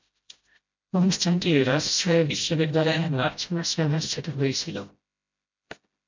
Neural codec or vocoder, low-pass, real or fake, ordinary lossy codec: codec, 16 kHz, 0.5 kbps, FreqCodec, smaller model; 7.2 kHz; fake; MP3, 64 kbps